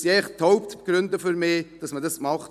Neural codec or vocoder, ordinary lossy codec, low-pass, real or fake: none; none; 14.4 kHz; real